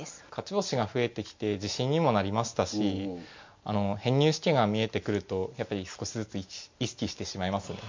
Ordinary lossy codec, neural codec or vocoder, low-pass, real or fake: MP3, 48 kbps; none; 7.2 kHz; real